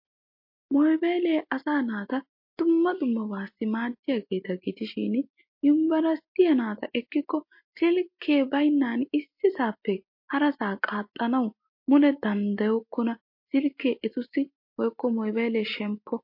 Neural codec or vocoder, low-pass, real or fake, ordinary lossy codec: none; 5.4 kHz; real; MP3, 32 kbps